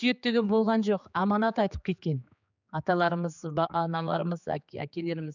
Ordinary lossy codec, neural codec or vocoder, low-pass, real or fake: none; codec, 16 kHz, 4 kbps, X-Codec, HuBERT features, trained on general audio; 7.2 kHz; fake